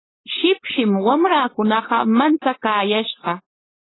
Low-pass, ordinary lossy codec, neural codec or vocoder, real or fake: 7.2 kHz; AAC, 16 kbps; codec, 16 kHz, 4.8 kbps, FACodec; fake